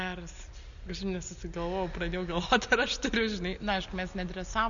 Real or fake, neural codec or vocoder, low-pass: real; none; 7.2 kHz